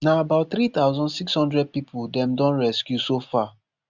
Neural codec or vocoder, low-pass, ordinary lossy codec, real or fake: none; 7.2 kHz; none; real